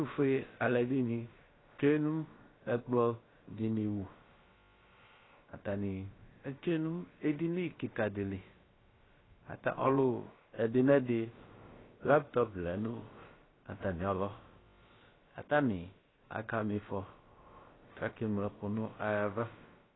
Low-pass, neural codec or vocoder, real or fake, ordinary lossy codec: 7.2 kHz; codec, 16 kHz, about 1 kbps, DyCAST, with the encoder's durations; fake; AAC, 16 kbps